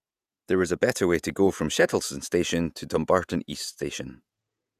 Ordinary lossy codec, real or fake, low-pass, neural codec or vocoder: none; fake; 14.4 kHz; vocoder, 48 kHz, 128 mel bands, Vocos